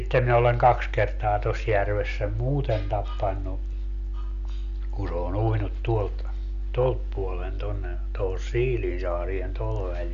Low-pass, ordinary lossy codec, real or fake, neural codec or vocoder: 7.2 kHz; none; real; none